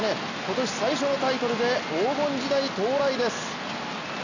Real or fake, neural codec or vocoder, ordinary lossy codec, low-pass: real; none; none; 7.2 kHz